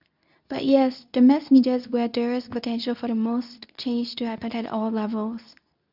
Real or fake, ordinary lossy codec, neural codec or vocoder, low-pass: fake; none; codec, 24 kHz, 0.9 kbps, WavTokenizer, medium speech release version 2; 5.4 kHz